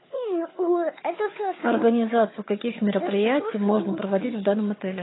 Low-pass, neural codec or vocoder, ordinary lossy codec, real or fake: 7.2 kHz; codec, 16 kHz, 4 kbps, FunCodec, trained on Chinese and English, 50 frames a second; AAC, 16 kbps; fake